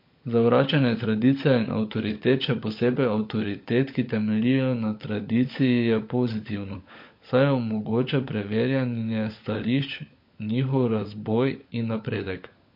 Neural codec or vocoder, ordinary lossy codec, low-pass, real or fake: codec, 16 kHz, 16 kbps, FunCodec, trained on LibriTTS, 50 frames a second; MP3, 32 kbps; 5.4 kHz; fake